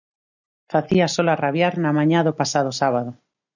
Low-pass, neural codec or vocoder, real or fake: 7.2 kHz; none; real